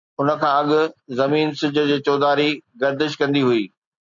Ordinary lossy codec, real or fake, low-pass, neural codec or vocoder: MP3, 64 kbps; real; 7.2 kHz; none